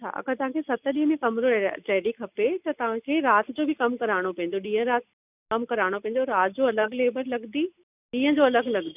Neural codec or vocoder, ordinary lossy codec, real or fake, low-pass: none; none; real; 3.6 kHz